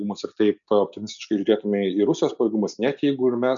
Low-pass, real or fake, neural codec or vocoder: 7.2 kHz; real; none